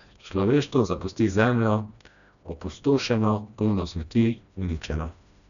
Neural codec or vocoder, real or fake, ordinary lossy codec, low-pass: codec, 16 kHz, 1 kbps, FreqCodec, smaller model; fake; none; 7.2 kHz